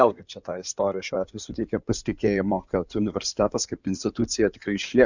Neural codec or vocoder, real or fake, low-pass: codec, 16 kHz in and 24 kHz out, 2.2 kbps, FireRedTTS-2 codec; fake; 7.2 kHz